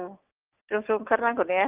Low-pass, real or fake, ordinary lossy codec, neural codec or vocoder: 3.6 kHz; real; Opus, 32 kbps; none